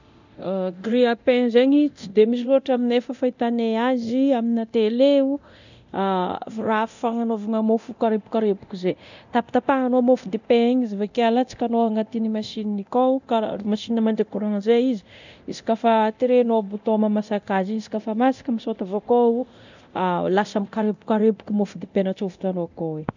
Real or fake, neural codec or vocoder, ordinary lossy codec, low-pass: fake; codec, 24 kHz, 0.9 kbps, DualCodec; none; 7.2 kHz